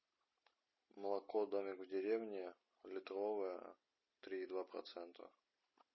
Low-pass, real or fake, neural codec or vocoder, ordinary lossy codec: 7.2 kHz; real; none; MP3, 24 kbps